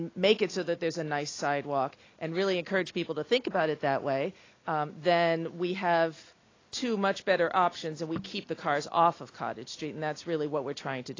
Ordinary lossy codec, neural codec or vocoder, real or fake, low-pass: AAC, 32 kbps; none; real; 7.2 kHz